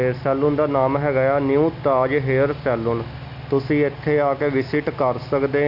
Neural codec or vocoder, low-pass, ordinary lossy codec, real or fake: none; 5.4 kHz; none; real